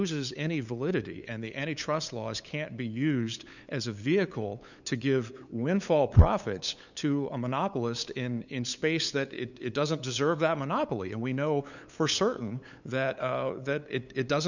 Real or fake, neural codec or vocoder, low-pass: fake; codec, 16 kHz, 8 kbps, FunCodec, trained on LibriTTS, 25 frames a second; 7.2 kHz